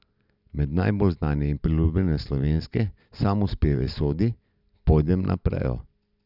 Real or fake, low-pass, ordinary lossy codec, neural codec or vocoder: real; 5.4 kHz; none; none